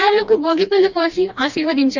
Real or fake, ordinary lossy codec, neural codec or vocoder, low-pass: fake; none; codec, 16 kHz, 1 kbps, FreqCodec, smaller model; 7.2 kHz